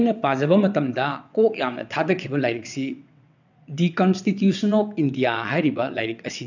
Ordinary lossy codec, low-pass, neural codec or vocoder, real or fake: none; 7.2 kHz; vocoder, 22.05 kHz, 80 mel bands, WaveNeXt; fake